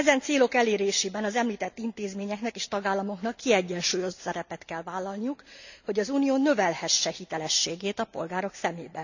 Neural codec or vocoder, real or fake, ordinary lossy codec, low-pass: none; real; none; 7.2 kHz